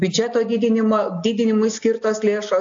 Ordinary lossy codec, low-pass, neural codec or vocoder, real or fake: AAC, 48 kbps; 7.2 kHz; none; real